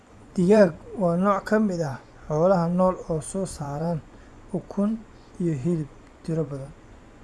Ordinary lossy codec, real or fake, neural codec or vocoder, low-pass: none; fake; vocoder, 24 kHz, 100 mel bands, Vocos; none